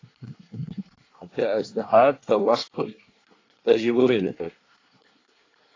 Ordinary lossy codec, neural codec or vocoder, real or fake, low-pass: AAC, 32 kbps; codec, 24 kHz, 1 kbps, SNAC; fake; 7.2 kHz